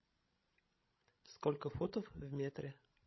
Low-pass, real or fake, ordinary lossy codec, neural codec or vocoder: 7.2 kHz; fake; MP3, 24 kbps; codec, 16 kHz, 16 kbps, FreqCodec, larger model